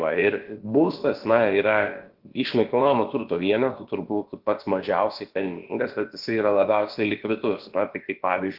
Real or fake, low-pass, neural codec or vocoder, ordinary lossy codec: fake; 5.4 kHz; codec, 16 kHz, about 1 kbps, DyCAST, with the encoder's durations; Opus, 16 kbps